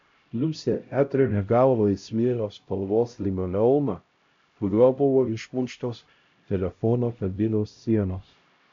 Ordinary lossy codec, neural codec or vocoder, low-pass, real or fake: MP3, 64 kbps; codec, 16 kHz, 0.5 kbps, X-Codec, HuBERT features, trained on LibriSpeech; 7.2 kHz; fake